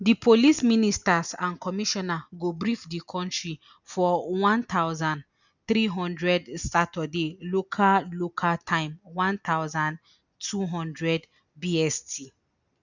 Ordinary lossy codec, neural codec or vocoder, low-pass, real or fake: none; none; 7.2 kHz; real